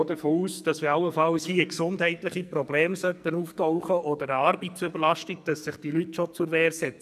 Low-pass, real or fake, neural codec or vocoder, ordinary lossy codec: 14.4 kHz; fake; codec, 44.1 kHz, 2.6 kbps, SNAC; none